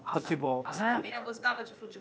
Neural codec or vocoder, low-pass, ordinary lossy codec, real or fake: codec, 16 kHz, 0.8 kbps, ZipCodec; none; none; fake